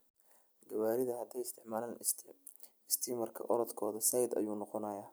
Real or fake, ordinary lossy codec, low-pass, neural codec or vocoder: real; none; none; none